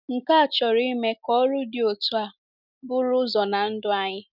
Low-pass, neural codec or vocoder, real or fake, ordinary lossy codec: 5.4 kHz; none; real; none